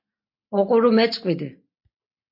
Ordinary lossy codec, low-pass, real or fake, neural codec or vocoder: MP3, 32 kbps; 5.4 kHz; real; none